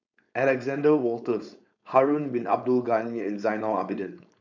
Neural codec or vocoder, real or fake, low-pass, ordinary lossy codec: codec, 16 kHz, 4.8 kbps, FACodec; fake; 7.2 kHz; none